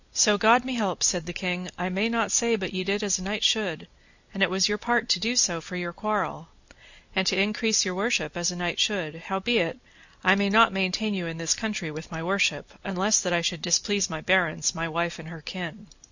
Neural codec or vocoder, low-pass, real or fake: none; 7.2 kHz; real